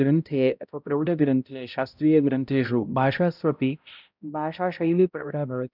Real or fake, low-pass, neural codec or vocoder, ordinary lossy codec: fake; 5.4 kHz; codec, 16 kHz, 0.5 kbps, X-Codec, HuBERT features, trained on balanced general audio; none